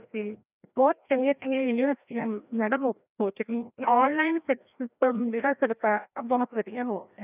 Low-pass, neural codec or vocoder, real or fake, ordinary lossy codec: 3.6 kHz; codec, 16 kHz, 1 kbps, FreqCodec, larger model; fake; none